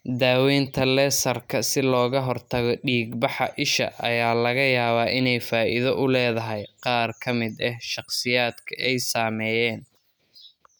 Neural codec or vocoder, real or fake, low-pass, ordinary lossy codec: none; real; none; none